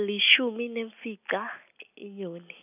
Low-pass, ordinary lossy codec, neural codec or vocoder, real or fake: 3.6 kHz; none; none; real